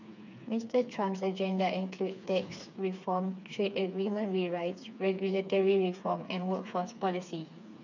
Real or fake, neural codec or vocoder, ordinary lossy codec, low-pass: fake; codec, 16 kHz, 4 kbps, FreqCodec, smaller model; none; 7.2 kHz